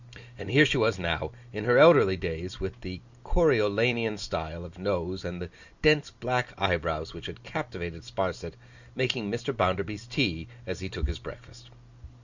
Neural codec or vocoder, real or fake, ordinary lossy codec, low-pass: none; real; Opus, 64 kbps; 7.2 kHz